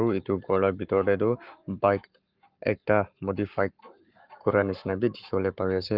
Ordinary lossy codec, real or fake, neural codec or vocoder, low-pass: Opus, 24 kbps; fake; codec, 44.1 kHz, 7.8 kbps, Pupu-Codec; 5.4 kHz